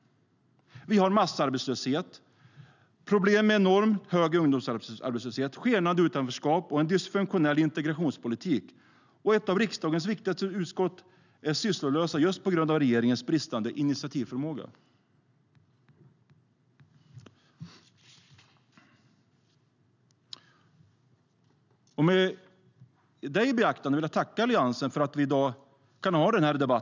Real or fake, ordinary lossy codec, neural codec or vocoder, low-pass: real; none; none; 7.2 kHz